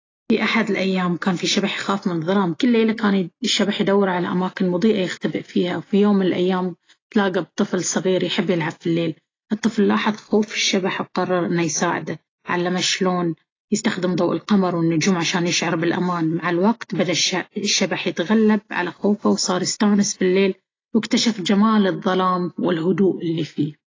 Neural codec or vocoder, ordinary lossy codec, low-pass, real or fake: none; AAC, 32 kbps; 7.2 kHz; real